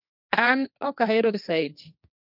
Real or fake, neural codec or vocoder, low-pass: fake; codec, 16 kHz, 1.1 kbps, Voila-Tokenizer; 5.4 kHz